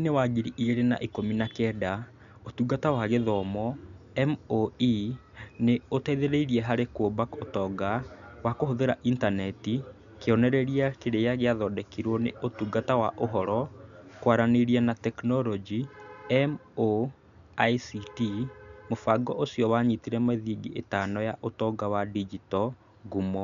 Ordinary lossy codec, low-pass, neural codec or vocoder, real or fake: none; 7.2 kHz; none; real